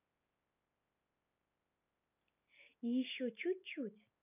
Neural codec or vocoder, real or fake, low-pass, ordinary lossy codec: none; real; 3.6 kHz; none